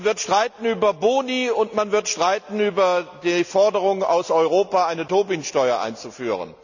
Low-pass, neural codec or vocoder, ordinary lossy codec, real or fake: 7.2 kHz; none; none; real